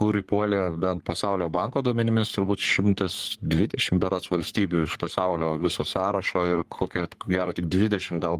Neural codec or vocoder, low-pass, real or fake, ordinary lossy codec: codec, 44.1 kHz, 3.4 kbps, Pupu-Codec; 14.4 kHz; fake; Opus, 24 kbps